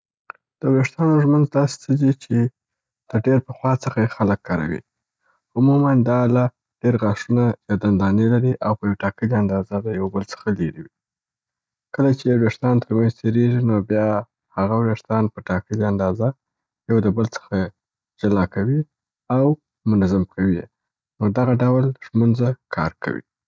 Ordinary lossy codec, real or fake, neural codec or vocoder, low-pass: none; real; none; none